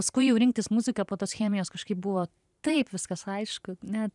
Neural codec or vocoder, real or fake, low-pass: vocoder, 44.1 kHz, 128 mel bands every 512 samples, BigVGAN v2; fake; 10.8 kHz